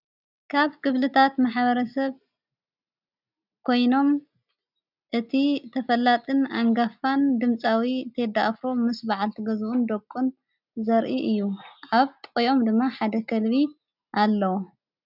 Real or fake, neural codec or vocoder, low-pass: real; none; 5.4 kHz